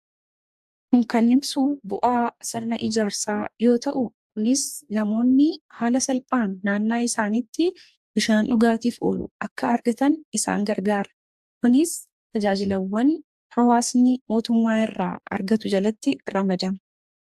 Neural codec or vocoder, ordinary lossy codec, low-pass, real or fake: codec, 44.1 kHz, 2.6 kbps, DAC; AAC, 96 kbps; 14.4 kHz; fake